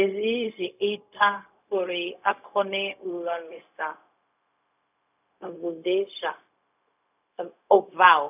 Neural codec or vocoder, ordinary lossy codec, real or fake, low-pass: codec, 16 kHz, 0.4 kbps, LongCat-Audio-Codec; none; fake; 3.6 kHz